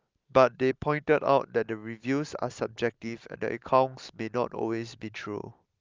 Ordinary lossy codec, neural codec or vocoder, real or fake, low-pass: Opus, 24 kbps; none; real; 7.2 kHz